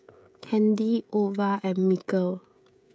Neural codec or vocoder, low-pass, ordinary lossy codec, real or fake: codec, 16 kHz, 16 kbps, FreqCodec, smaller model; none; none; fake